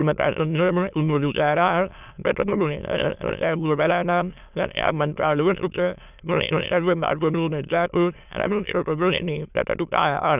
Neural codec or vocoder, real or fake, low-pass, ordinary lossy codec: autoencoder, 22.05 kHz, a latent of 192 numbers a frame, VITS, trained on many speakers; fake; 3.6 kHz; none